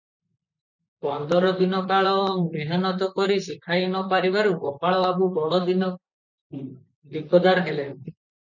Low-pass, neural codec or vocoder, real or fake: 7.2 kHz; vocoder, 44.1 kHz, 128 mel bands, Pupu-Vocoder; fake